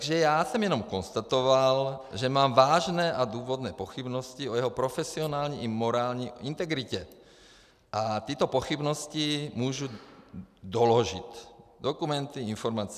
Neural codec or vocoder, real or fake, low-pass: none; real; 14.4 kHz